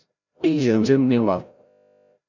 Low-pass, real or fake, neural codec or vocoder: 7.2 kHz; fake; codec, 16 kHz, 0.5 kbps, FreqCodec, larger model